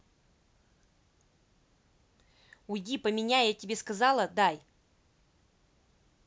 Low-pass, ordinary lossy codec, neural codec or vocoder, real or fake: none; none; none; real